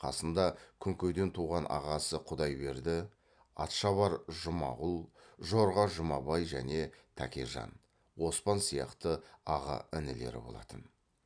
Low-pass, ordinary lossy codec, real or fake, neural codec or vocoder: 9.9 kHz; MP3, 96 kbps; real; none